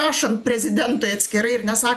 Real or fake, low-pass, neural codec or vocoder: fake; 14.4 kHz; vocoder, 48 kHz, 128 mel bands, Vocos